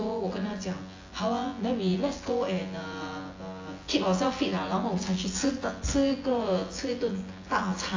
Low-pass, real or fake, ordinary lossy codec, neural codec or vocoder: 7.2 kHz; fake; AAC, 32 kbps; vocoder, 24 kHz, 100 mel bands, Vocos